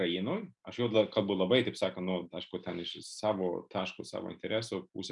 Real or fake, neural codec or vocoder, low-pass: real; none; 10.8 kHz